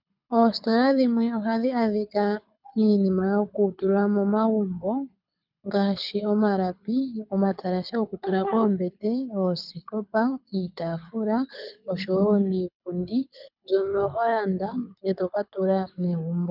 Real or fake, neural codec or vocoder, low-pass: fake; codec, 24 kHz, 6 kbps, HILCodec; 5.4 kHz